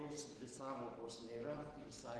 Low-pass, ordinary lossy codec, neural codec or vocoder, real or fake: 10.8 kHz; Opus, 16 kbps; none; real